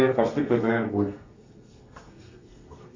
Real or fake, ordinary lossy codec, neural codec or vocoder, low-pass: fake; MP3, 64 kbps; codec, 32 kHz, 1.9 kbps, SNAC; 7.2 kHz